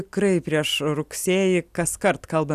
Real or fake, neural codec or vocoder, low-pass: real; none; 14.4 kHz